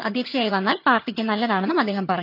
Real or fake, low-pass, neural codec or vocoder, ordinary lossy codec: fake; 5.4 kHz; vocoder, 22.05 kHz, 80 mel bands, HiFi-GAN; none